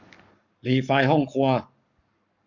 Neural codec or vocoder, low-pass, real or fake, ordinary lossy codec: codec, 44.1 kHz, 7.8 kbps, Pupu-Codec; 7.2 kHz; fake; none